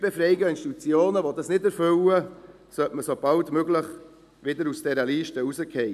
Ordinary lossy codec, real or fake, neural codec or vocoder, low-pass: none; real; none; 14.4 kHz